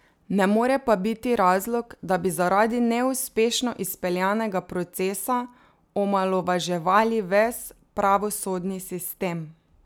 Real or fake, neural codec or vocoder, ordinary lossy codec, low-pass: real; none; none; none